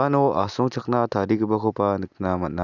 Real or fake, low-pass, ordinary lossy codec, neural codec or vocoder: real; 7.2 kHz; none; none